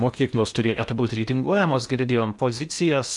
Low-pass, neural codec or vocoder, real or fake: 10.8 kHz; codec, 16 kHz in and 24 kHz out, 0.8 kbps, FocalCodec, streaming, 65536 codes; fake